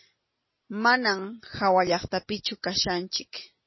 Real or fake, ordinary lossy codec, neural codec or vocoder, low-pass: real; MP3, 24 kbps; none; 7.2 kHz